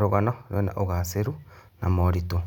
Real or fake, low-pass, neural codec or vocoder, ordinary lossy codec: real; 19.8 kHz; none; none